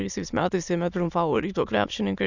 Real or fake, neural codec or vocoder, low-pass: fake; autoencoder, 22.05 kHz, a latent of 192 numbers a frame, VITS, trained on many speakers; 7.2 kHz